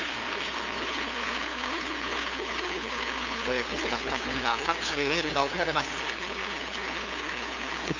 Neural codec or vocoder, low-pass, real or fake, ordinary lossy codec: codec, 16 kHz, 2 kbps, FunCodec, trained on LibriTTS, 25 frames a second; 7.2 kHz; fake; none